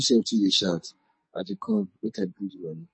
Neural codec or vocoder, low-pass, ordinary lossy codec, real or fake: codec, 44.1 kHz, 2.6 kbps, SNAC; 9.9 kHz; MP3, 32 kbps; fake